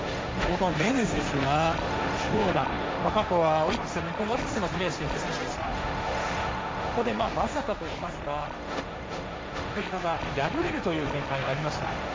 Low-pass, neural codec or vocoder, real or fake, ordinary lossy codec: none; codec, 16 kHz, 1.1 kbps, Voila-Tokenizer; fake; none